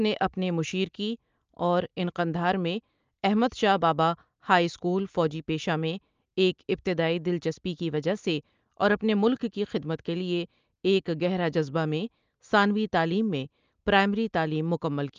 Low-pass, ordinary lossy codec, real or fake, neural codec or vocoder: 7.2 kHz; Opus, 24 kbps; real; none